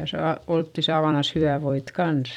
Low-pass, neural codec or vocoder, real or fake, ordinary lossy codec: 19.8 kHz; vocoder, 44.1 kHz, 128 mel bands every 256 samples, BigVGAN v2; fake; none